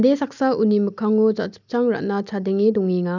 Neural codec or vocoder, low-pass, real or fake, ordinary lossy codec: none; 7.2 kHz; real; none